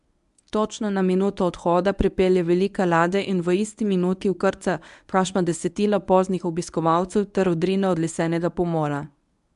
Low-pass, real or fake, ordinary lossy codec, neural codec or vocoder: 10.8 kHz; fake; AAC, 96 kbps; codec, 24 kHz, 0.9 kbps, WavTokenizer, medium speech release version 1